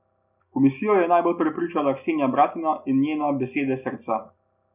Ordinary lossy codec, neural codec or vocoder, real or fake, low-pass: none; none; real; 3.6 kHz